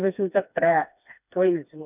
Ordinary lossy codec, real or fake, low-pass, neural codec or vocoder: none; fake; 3.6 kHz; codec, 16 kHz, 2 kbps, FreqCodec, smaller model